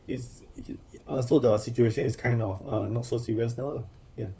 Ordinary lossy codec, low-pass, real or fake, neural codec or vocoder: none; none; fake; codec, 16 kHz, 4 kbps, FunCodec, trained on LibriTTS, 50 frames a second